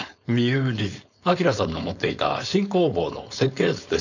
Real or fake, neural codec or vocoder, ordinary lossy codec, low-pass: fake; codec, 16 kHz, 4.8 kbps, FACodec; none; 7.2 kHz